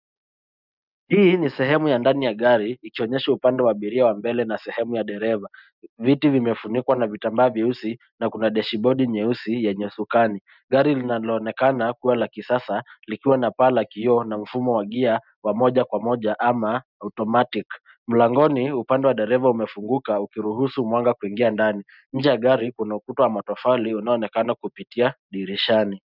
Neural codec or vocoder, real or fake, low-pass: none; real; 5.4 kHz